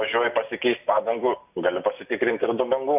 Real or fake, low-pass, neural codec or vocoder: real; 3.6 kHz; none